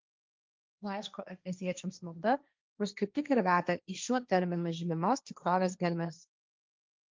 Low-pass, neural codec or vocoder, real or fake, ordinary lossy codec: 7.2 kHz; codec, 16 kHz, 1.1 kbps, Voila-Tokenizer; fake; Opus, 24 kbps